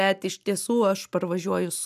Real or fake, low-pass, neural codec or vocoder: real; 14.4 kHz; none